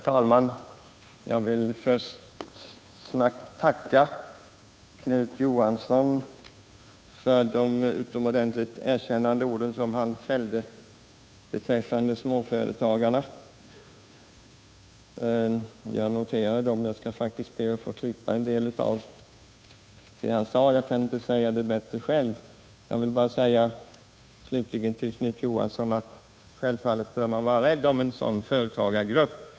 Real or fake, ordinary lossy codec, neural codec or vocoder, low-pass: fake; none; codec, 16 kHz, 2 kbps, FunCodec, trained on Chinese and English, 25 frames a second; none